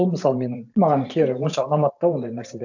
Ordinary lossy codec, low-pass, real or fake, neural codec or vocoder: none; 7.2 kHz; fake; vocoder, 44.1 kHz, 128 mel bands every 512 samples, BigVGAN v2